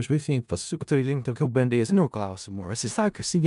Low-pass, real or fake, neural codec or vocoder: 10.8 kHz; fake; codec, 16 kHz in and 24 kHz out, 0.4 kbps, LongCat-Audio-Codec, four codebook decoder